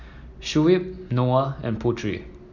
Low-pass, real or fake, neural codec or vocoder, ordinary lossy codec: 7.2 kHz; real; none; none